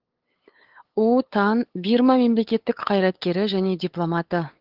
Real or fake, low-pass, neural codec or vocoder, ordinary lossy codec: fake; 5.4 kHz; codec, 16 kHz, 8 kbps, FunCodec, trained on LibriTTS, 25 frames a second; Opus, 16 kbps